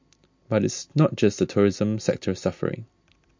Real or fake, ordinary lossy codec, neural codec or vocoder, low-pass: real; MP3, 48 kbps; none; 7.2 kHz